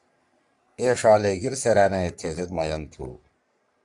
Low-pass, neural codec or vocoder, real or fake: 10.8 kHz; codec, 44.1 kHz, 3.4 kbps, Pupu-Codec; fake